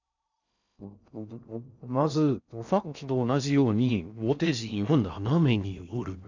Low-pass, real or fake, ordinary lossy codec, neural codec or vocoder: 7.2 kHz; fake; none; codec, 16 kHz in and 24 kHz out, 0.6 kbps, FocalCodec, streaming, 2048 codes